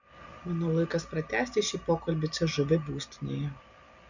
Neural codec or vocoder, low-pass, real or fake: none; 7.2 kHz; real